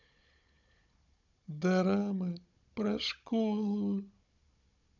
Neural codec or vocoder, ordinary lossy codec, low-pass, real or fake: codec, 16 kHz, 16 kbps, FunCodec, trained on Chinese and English, 50 frames a second; none; 7.2 kHz; fake